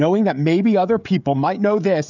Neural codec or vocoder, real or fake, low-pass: codec, 16 kHz, 16 kbps, FreqCodec, smaller model; fake; 7.2 kHz